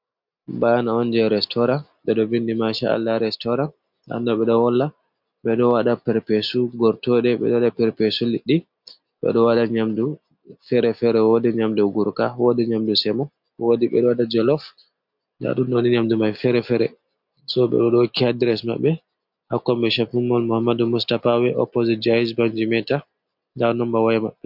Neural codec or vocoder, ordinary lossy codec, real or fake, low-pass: none; MP3, 48 kbps; real; 5.4 kHz